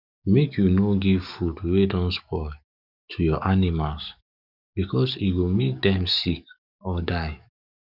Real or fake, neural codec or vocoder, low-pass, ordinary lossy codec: fake; codec, 44.1 kHz, 7.8 kbps, DAC; 5.4 kHz; none